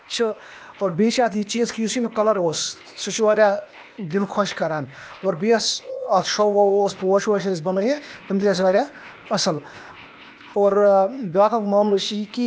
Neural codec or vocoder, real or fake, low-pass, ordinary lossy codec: codec, 16 kHz, 0.8 kbps, ZipCodec; fake; none; none